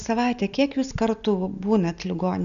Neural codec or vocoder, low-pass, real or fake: none; 7.2 kHz; real